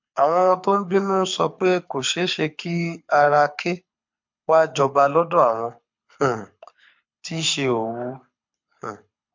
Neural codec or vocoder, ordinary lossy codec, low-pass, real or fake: codec, 24 kHz, 6 kbps, HILCodec; MP3, 48 kbps; 7.2 kHz; fake